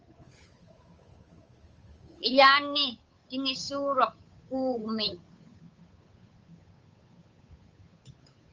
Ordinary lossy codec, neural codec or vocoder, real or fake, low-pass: Opus, 24 kbps; codec, 16 kHz, 8 kbps, FunCodec, trained on Chinese and English, 25 frames a second; fake; 7.2 kHz